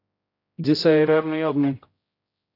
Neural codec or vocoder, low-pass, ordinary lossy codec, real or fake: codec, 16 kHz, 0.5 kbps, X-Codec, HuBERT features, trained on general audio; 5.4 kHz; AAC, 32 kbps; fake